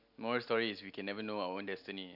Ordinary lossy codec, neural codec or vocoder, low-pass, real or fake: none; none; 5.4 kHz; real